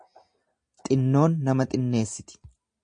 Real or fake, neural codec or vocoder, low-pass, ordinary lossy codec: real; none; 9.9 kHz; MP3, 48 kbps